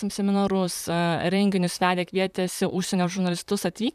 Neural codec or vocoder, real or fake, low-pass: vocoder, 44.1 kHz, 128 mel bands, Pupu-Vocoder; fake; 14.4 kHz